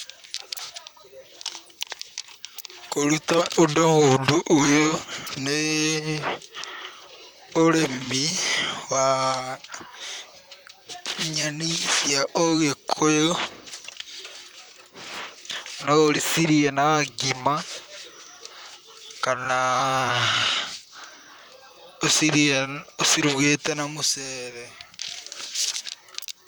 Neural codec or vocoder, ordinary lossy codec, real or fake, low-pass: vocoder, 44.1 kHz, 128 mel bands, Pupu-Vocoder; none; fake; none